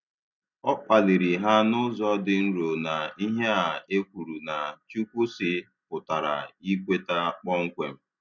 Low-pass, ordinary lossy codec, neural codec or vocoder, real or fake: 7.2 kHz; none; none; real